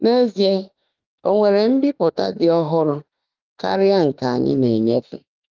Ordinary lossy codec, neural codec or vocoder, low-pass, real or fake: Opus, 24 kbps; autoencoder, 48 kHz, 32 numbers a frame, DAC-VAE, trained on Japanese speech; 7.2 kHz; fake